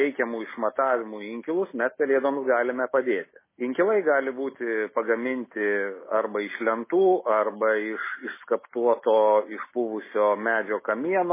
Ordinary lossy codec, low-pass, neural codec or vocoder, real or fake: MP3, 16 kbps; 3.6 kHz; none; real